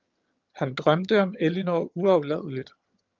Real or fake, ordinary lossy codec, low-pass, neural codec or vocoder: fake; Opus, 32 kbps; 7.2 kHz; vocoder, 22.05 kHz, 80 mel bands, HiFi-GAN